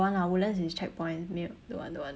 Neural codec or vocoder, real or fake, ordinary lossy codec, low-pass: none; real; none; none